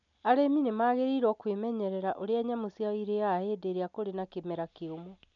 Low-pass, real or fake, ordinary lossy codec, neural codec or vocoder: 7.2 kHz; real; none; none